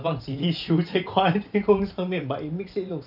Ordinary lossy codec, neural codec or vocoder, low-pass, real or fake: none; vocoder, 44.1 kHz, 128 mel bands every 512 samples, BigVGAN v2; 5.4 kHz; fake